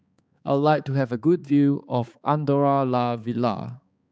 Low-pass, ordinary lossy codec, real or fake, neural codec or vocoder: none; none; fake; codec, 16 kHz, 4 kbps, X-Codec, HuBERT features, trained on balanced general audio